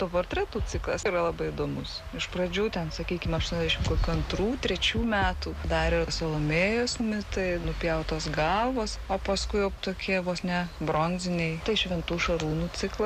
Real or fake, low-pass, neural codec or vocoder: real; 14.4 kHz; none